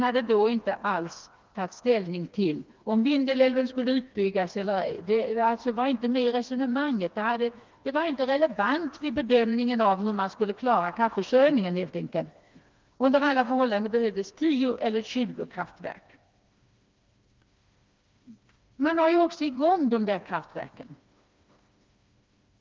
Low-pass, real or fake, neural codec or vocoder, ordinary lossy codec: 7.2 kHz; fake; codec, 16 kHz, 2 kbps, FreqCodec, smaller model; Opus, 24 kbps